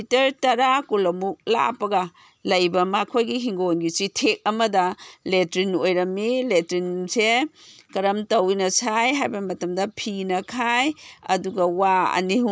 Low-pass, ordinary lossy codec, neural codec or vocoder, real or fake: none; none; none; real